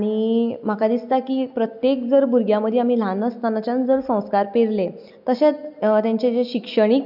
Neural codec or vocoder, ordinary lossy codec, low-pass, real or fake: none; none; 5.4 kHz; real